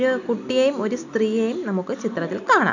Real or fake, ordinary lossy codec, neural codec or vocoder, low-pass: real; none; none; 7.2 kHz